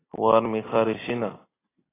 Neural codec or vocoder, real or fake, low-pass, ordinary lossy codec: none; real; 3.6 kHz; AAC, 16 kbps